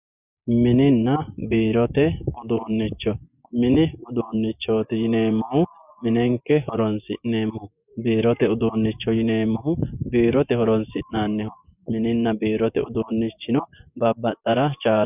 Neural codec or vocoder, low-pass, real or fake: vocoder, 24 kHz, 100 mel bands, Vocos; 3.6 kHz; fake